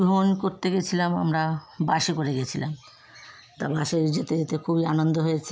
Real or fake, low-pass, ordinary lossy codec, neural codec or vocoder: real; none; none; none